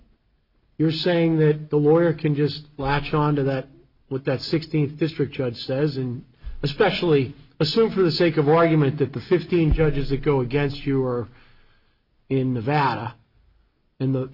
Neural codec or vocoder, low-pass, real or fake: none; 5.4 kHz; real